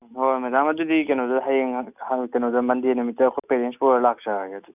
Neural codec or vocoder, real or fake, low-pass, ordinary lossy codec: none; real; 3.6 kHz; none